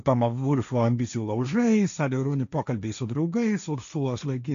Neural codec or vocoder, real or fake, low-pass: codec, 16 kHz, 1.1 kbps, Voila-Tokenizer; fake; 7.2 kHz